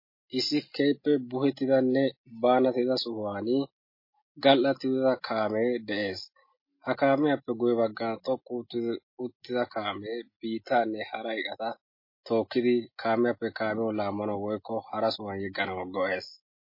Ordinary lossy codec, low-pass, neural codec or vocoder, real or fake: MP3, 24 kbps; 5.4 kHz; none; real